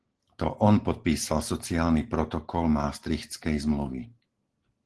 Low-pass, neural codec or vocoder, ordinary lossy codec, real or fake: 10.8 kHz; none; Opus, 16 kbps; real